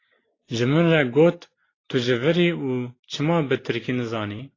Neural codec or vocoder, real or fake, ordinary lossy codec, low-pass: none; real; AAC, 32 kbps; 7.2 kHz